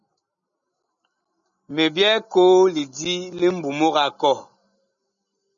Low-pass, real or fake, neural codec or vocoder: 7.2 kHz; real; none